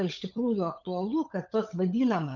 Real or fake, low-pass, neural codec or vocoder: fake; 7.2 kHz; codec, 16 kHz, 16 kbps, FunCodec, trained on LibriTTS, 50 frames a second